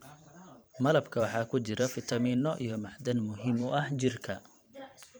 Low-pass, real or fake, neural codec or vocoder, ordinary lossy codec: none; fake; vocoder, 44.1 kHz, 128 mel bands every 512 samples, BigVGAN v2; none